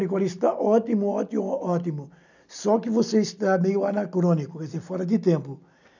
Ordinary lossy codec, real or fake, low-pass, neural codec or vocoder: none; real; 7.2 kHz; none